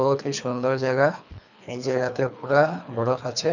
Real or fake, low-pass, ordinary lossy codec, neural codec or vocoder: fake; 7.2 kHz; none; codec, 24 kHz, 3 kbps, HILCodec